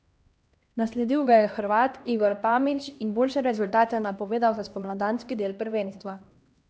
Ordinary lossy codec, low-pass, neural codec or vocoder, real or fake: none; none; codec, 16 kHz, 1 kbps, X-Codec, HuBERT features, trained on LibriSpeech; fake